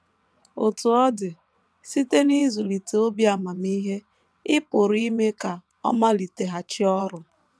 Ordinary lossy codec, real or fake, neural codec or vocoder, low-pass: none; fake; vocoder, 22.05 kHz, 80 mel bands, WaveNeXt; none